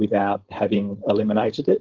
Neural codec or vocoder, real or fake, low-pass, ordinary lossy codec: codec, 16 kHz, 4.8 kbps, FACodec; fake; 7.2 kHz; Opus, 16 kbps